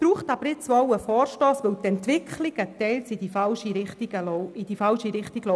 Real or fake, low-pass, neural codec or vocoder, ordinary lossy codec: real; none; none; none